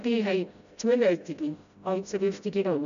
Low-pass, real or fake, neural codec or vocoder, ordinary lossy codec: 7.2 kHz; fake; codec, 16 kHz, 0.5 kbps, FreqCodec, smaller model; none